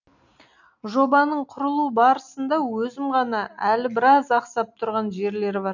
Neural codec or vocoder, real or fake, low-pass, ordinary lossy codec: none; real; 7.2 kHz; none